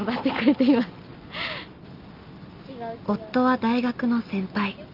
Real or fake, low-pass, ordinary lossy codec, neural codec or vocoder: real; 5.4 kHz; Opus, 32 kbps; none